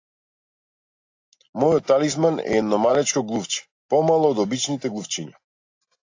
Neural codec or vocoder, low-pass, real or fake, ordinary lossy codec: none; 7.2 kHz; real; AAC, 48 kbps